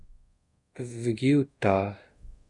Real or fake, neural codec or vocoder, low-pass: fake; codec, 24 kHz, 0.5 kbps, DualCodec; 10.8 kHz